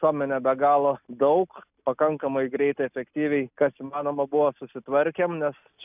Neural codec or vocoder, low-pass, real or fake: none; 3.6 kHz; real